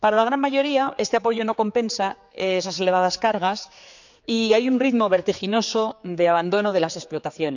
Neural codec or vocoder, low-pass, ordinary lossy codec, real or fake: codec, 16 kHz, 4 kbps, X-Codec, HuBERT features, trained on general audio; 7.2 kHz; none; fake